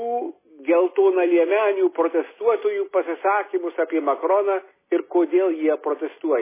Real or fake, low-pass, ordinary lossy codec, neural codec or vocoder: real; 3.6 kHz; MP3, 16 kbps; none